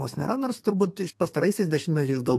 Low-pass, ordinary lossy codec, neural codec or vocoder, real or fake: 14.4 kHz; AAC, 64 kbps; codec, 32 kHz, 1.9 kbps, SNAC; fake